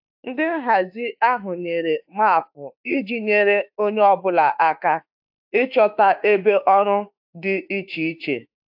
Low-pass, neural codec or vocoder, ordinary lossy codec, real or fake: 5.4 kHz; autoencoder, 48 kHz, 32 numbers a frame, DAC-VAE, trained on Japanese speech; AAC, 48 kbps; fake